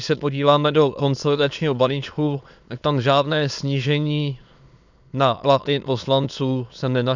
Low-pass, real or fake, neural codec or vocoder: 7.2 kHz; fake; autoencoder, 22.05 kHz, a latent of 192 numbers a frame, VITS, trained on many speakers